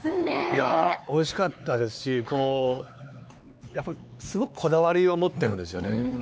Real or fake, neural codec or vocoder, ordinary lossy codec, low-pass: fake; codec, 16 kHz, 4 kbps, X-Codec, HuBERT features, trained on LibriSpeech; none; none